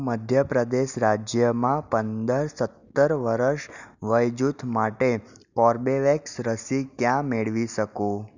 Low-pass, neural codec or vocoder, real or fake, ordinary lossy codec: 7.2 kHz; none; real; none